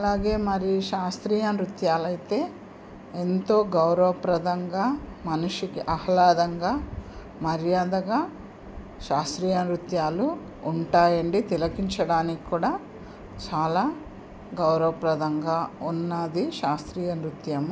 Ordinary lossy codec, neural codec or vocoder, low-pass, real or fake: none; none; none; real